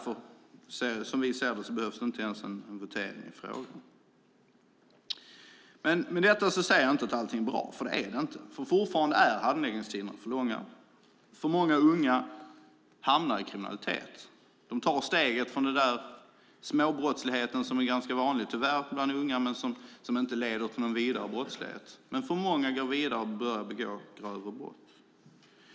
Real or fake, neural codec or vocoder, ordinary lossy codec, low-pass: real; none; none; none